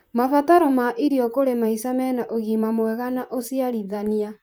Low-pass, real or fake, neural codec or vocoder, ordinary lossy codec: none; fake; vocoder, 44.1 kHz, 128 mel bands, Pupu-Vocoder; none